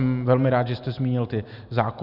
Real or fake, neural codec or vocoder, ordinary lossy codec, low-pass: real; none; Opus, 64 kbps; 5.4 kHz